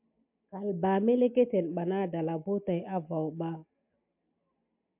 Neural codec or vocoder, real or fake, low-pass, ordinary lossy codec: none; real; 3.6 kHz; MP3, 32 kbps